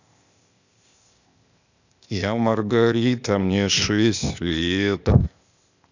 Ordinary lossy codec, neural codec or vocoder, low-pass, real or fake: none; codec, 16 kHz, 0.8 kbps, ZipCodec; 7.2 kHz; fake